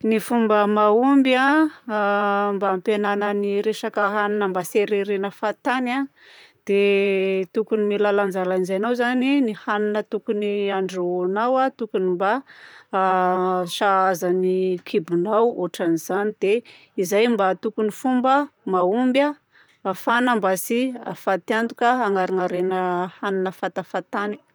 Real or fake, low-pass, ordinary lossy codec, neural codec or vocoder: fake; none; none; vocoder, 44.1 kHz, 128 mel bands, Pupu-Vocoder